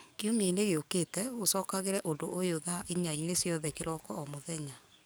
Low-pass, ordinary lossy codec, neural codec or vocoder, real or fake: none; none; codec, 44.1 kHz, 7.8 kbps, DAC; fake